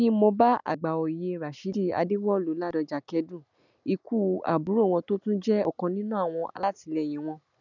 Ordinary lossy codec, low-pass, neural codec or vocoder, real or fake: none; 7.2 kHz; none; real